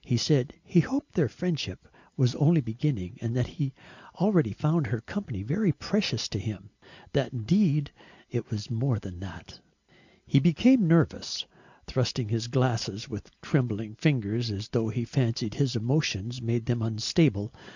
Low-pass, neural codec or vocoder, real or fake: 7.2 kHz; none; real